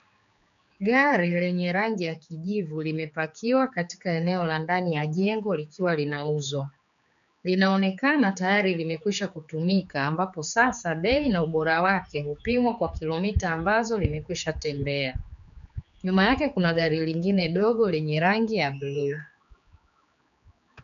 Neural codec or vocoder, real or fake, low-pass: codec, 16 kHz, 4 kbps, X-Codec, HuBERT features, trained on general audio; fake; 7.2 kHz